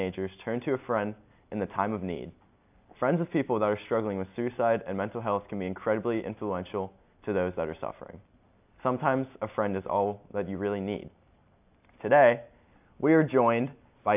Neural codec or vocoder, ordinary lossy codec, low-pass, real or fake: none; AAC, 32 kbps; 3.6 kHz; real